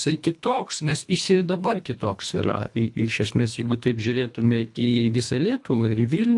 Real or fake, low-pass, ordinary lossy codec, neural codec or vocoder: fake; 10.8 kHz; AAC, 64 kbps; codec, 24 kHz, 1.5 kbps, HILCodec